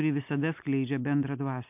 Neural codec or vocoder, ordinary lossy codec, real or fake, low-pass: none; MP3, 32 kbps; real; 3.6 kHz